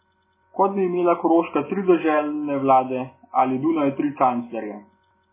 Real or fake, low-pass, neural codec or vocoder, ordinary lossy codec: real; 3.6 kHz; none; MP3, 16 kbps